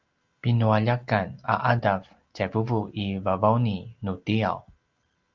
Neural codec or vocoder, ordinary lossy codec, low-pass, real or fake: none; Opus, 32 kbps; 7.2 kHz; real